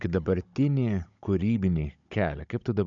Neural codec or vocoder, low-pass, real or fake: codec, 16 kHz, 16 kbps, FunCodec, trained on Chinese and English, 50 frames a second; 7.2 kHz; fake